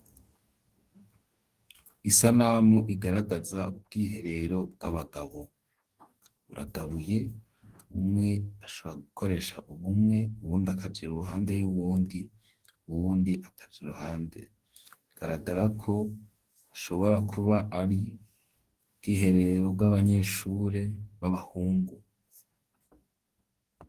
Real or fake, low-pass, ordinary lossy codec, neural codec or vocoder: fake; 14.4 kHz; Opus, 24 kbps; codec, 44.1 kHz, 2.6 kbps, DAC